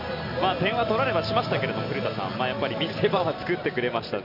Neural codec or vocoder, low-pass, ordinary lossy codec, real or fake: none; 5.4 kHz; none; real